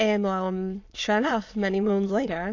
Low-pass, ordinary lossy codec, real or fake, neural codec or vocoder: 7.2 kHz; AAC, 48 kbps; fake; autoencoder, 22.05 kHz, a latent of 192 numbers a frame, VITS, trained on many speakers